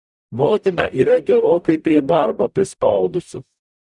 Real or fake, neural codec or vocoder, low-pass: fake; codec, 44.1 kHz, 0.9 kbps, DAC; 10.8 kHz